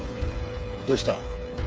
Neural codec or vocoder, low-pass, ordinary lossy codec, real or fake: codec, 16 kHz, 8 kbps, FreqCodec, smaller model; none; none; fake